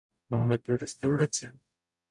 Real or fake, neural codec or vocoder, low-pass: fake; codec, 44.1 kHz, 0.9 kbps, DAC; 10.8 kHz